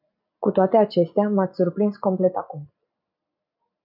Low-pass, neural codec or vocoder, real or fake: 5.4 kHz; none; real